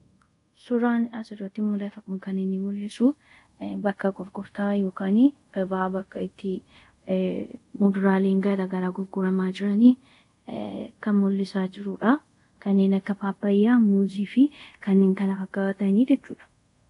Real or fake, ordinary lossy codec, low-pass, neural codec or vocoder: fake; AAC, 48 kbps; 10.8 kHz; codec, 24 kHz, 0.5 kbps, DualCodec